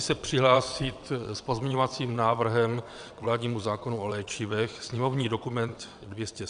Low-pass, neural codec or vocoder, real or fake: 9.9 kHz; vocoder, 22.05 kHz, 80 mel bands, WaveNeXt; fake